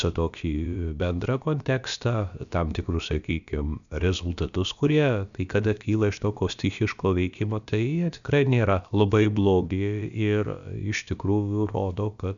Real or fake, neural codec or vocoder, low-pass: fake; codec, 16 kHz, about 1 kbps, DyCAST, with the encoder's durations; 7.2 kHz